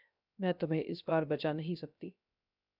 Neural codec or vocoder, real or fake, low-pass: codec, 16 kHz, 0.3 kbps, FocalCodec; fake; 5.4 kHz